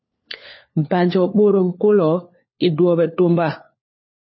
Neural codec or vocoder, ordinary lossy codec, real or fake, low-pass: codec, 16 kHz, 4 kbps, FunCodec, trained on LibriTTS, 50 frames a second; MP3, 24 kbps; fake; 7.2 kHz